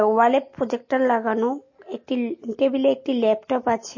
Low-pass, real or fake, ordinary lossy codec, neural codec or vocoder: 7.2 kHz; real; MP3, 32 kbps; none